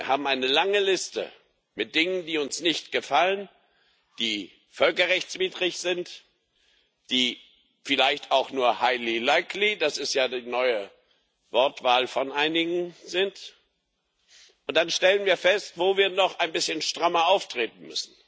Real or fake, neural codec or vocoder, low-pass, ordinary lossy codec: real; none; none; none